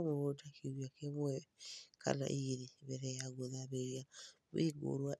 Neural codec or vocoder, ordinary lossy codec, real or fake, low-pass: none; none; real; none